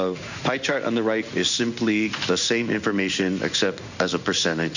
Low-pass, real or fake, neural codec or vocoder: 7.2 kHz; fake; codec, 16 kHz in and 24 kHz out, 1 kbps, XY-Tokenizer